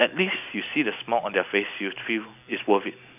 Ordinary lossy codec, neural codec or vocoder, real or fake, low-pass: none; none; real; 3.6 kHz